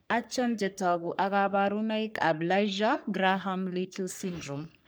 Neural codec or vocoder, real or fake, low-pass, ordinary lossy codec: codec, 44.1 kHz, 3.4 kbps, Pupu-Codec; fake; none; none